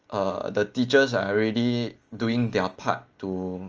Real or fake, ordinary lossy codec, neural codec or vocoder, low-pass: real; Opus, 24 kbps; none; 7.2 kHz